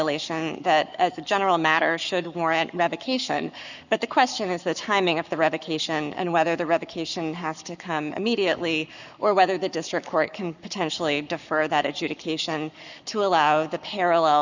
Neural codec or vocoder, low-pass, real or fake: codec, 44.1 kHz, 7.8 kbps, Pupu-Codec; 7.2 kHz; fake